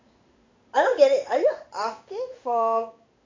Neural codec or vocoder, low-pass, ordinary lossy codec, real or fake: codec, 16 kHz in and 24 kHz out, 1 kbps, XY-Tokenizer; 7.2 kHz; MP3, 64 kbps; fake